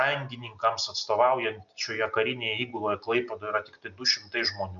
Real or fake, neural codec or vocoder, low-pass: real; none; 7.2 kHz